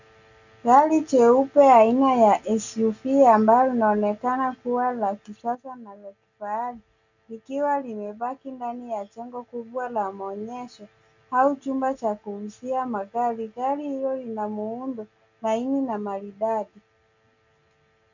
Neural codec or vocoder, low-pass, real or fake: none; 7.2 kHz; real